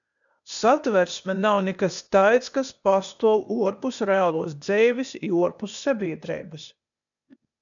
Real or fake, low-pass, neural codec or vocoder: fake; 7.2 kHz; codec, 16 kHz, 0.8 kbps, ZipCodec